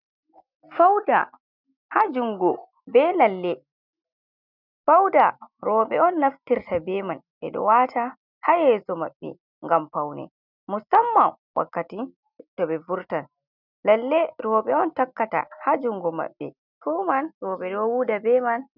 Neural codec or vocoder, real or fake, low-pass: none; real; 5.4 kHz